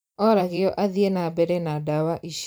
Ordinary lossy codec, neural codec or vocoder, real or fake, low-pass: none; vocoder, 44.1 kHz, 128 mel bands every 512 samples, BigVGAN v2; fake; none